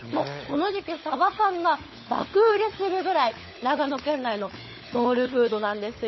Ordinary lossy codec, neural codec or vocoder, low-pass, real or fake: MP3, 24 kbps; codec, 16 kHz, 16 kbps, FunCodec, trained on LibriTTS, 50 frames a second; 7.2 kHz; fake